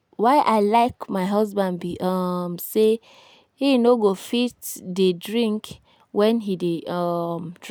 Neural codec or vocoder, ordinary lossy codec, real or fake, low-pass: none; none; real; none